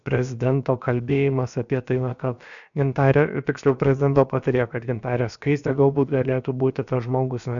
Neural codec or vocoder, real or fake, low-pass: codec, 16 kHz, about 1 kbps, DyCAST, with the encoder's durations; fake; 7.2 kHz